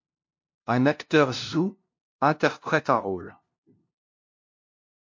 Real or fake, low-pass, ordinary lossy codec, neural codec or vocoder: fake; 7.2 kHz; MP3, 48 kbps; codec, 16 kHz, 0.5 kbps, FunCodec, trained on LibriTTS, 25 frames a second